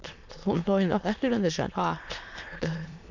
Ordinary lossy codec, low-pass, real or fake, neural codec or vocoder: none; 7.2 kHz; fake; autoencoder, 22.05 kHz, a latent of 192 numbers a frame, VITS, trained on many speakers